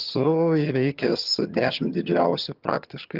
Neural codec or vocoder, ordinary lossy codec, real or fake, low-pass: vocoder, 22.05 kHz, 80 mel bands, HiFi-GAN; Opus, 32 kbps; fake; 5.4 kHz